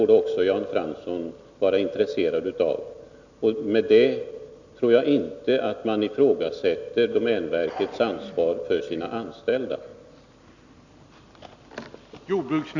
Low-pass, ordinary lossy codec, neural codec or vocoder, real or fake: 7.2 kHz; none; none; real